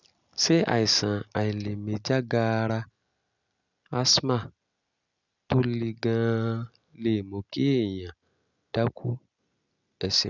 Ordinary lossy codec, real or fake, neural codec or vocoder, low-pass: none; real; none; 7.2 kHz